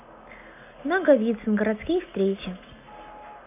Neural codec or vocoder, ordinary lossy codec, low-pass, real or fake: none; none; 3.6 kHz; real